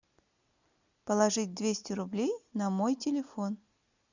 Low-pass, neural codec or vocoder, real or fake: 7.2 kHz; none; real